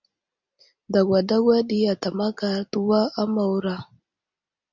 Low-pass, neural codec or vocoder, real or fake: 7.2 kHz; none; real